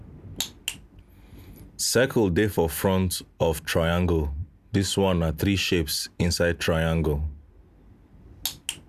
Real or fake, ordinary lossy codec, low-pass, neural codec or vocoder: real; none; 14.4 kHz; none